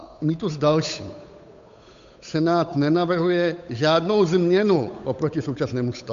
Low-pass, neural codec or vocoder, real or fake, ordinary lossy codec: 7.2 kHz; codec, 16 kHz, 8 kbps, FunCodec, trained on Chinese and English, 25 frames a second; fake; MP3, 64 kbps